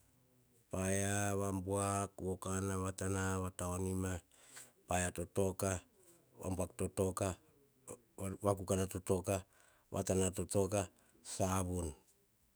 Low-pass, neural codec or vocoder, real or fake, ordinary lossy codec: none; autoencoder, 48 kHz, 128 numbers a frame, DAC-VAE, trained on Japanese speech; fake; none